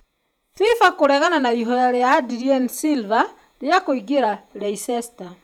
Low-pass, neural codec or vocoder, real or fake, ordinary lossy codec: 19.8 kHz; vocoder, 48 kHz, 128 mel bands, Vocos; fake; none